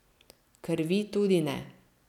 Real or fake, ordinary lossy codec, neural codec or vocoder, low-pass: real; none; none; 19.8 kHz